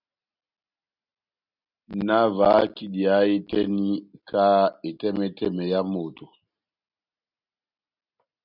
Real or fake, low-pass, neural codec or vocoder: real; 5.4 kHz; none